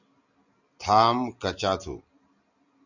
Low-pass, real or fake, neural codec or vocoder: 7.2 kHz; real; none